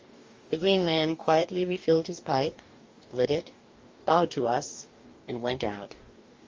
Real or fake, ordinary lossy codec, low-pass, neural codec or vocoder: fake; Opus, 32 kbps; 7.2 kHz; codec, 44.1 kHz, 2.6 kbps, DAC